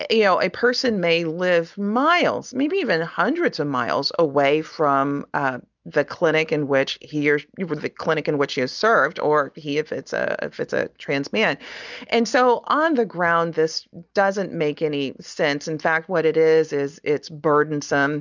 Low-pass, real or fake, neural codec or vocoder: 7.2 kHz; real; none